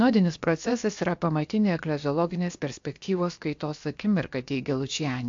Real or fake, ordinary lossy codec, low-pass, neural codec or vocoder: fake; AAC, 64 kbps; 7.2 kHz; codec, 16 kHz, about 1 kbps, DyCAST, with the encoder's durations